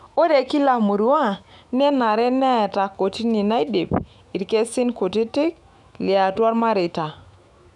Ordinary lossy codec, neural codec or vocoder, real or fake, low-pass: none; autoencoder, 48 kHz, 128 numbers a frame, DAC-VAE, trained on Japanese speech; fake; 10.8 kHz